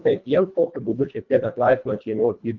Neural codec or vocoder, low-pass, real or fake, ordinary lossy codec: codec, 24 kHz, 1.5 kbps, HILCodec; 7.2 kHz; fake; Opus, 24 kbps